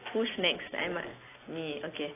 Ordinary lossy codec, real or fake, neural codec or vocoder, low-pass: AAC, 16 kbps; real; none; 3.6 kHz